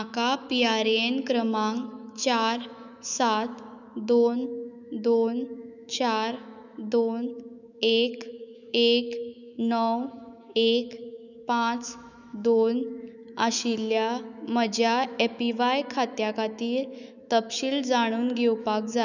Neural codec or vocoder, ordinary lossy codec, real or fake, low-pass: autoencoder, 48 kHz, 128 numbers a frame, DAC-VAE, trained on Japanese speech; none; fake; 7.2 kHz